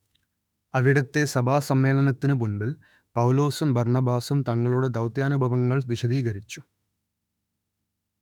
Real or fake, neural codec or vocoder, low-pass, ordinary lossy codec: fake; autoencoder, 48 kHz, 32 numbers a frame, DAC-VAE, trained on Japanese speech; 19.8 kHz; none